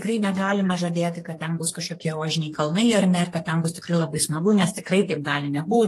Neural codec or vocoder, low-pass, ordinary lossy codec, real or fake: codec, 32 kHz, 1.9 kbps, SNAC; 14.4 kHz; AAC, 48 kbps; fake